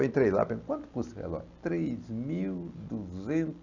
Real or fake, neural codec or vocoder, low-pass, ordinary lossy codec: real; none; 7.2 kHz; none